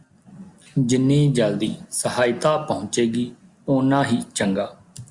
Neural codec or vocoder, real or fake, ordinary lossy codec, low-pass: none; real; Opus, 64 kbps; 10.8 kHz